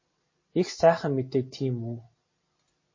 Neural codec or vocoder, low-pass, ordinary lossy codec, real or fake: none; 7.2 kHz; MP3, 32 kbps; real